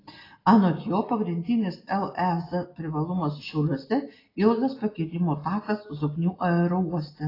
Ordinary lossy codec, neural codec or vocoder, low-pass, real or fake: AAC, 24 kbps; none; 5.4 kHz; real